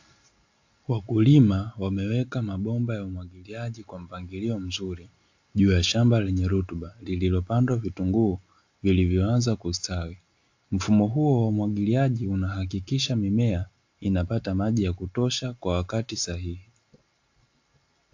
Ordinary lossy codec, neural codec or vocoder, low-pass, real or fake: AAC, 48 kbps; none; 7.2 kHz; real